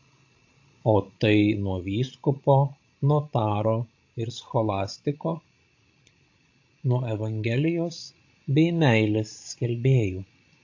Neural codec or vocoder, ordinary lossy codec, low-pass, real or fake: codec, 16 kHz, 16 kbps, FreqCodec, larger model; AAC, 48 kbps; 7.2 kHz; fake